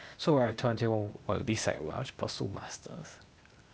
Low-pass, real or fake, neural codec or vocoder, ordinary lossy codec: none; fake; codec, 16 kHz, 0.8 kbps, ZipCodec; none